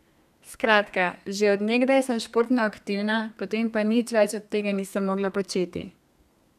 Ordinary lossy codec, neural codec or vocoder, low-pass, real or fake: none; codec, 32 kHz, 1.9 kbps, SNAC; 14.4 kHz; fake